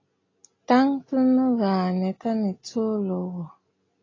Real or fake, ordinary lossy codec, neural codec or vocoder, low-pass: real; AAC, 32 kbps; none; 7.2 kHz